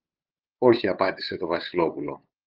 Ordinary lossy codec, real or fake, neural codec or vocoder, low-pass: Opus, 24 kbps; fake; codec, 16 kHz, 8 kbps, FunCodec, trained on LibriTTS, 25 frames a second; 5.4 kHz